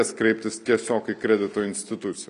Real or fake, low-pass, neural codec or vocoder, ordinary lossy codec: real; 10.8 kHz; none; MP3, 96 kbps